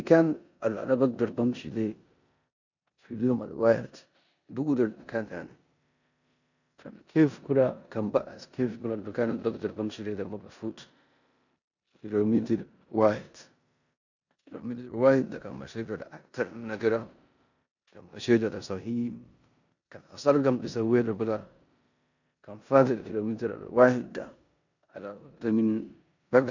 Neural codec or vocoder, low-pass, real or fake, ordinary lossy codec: codec, 16 kHz in and 24 kHz out, 0.9 kbps, LongCat-Audio-Codec, four codebook decoder; 7.2 kHz; fake; none